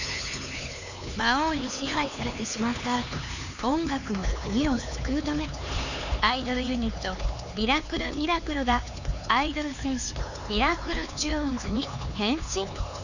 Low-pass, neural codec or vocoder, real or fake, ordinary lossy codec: 7.2 kHz; codec, 16 kHz, 4 kbps, X-Codec, HuBERT features, trained on LibriSpeech; fake; none